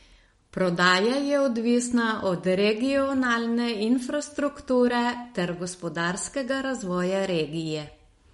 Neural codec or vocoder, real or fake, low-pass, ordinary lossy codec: none; real; 19.8 kHz; MP3, 48 kbps